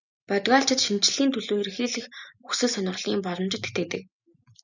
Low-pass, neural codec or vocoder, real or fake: 7.2 kHz; none; real